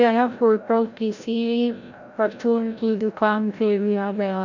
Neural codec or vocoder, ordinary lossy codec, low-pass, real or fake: codec, 16 kHz, 0.5 kbps, FreqCodec, larger model; none; 7.2 kHz; fake